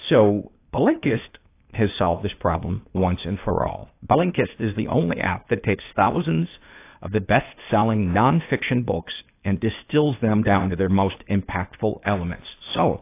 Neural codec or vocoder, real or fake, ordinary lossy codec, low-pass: codec, 16 kHz, 0.8 kbps, ZipCodec; fake; AAC, 24 kbps; 3.6 kHz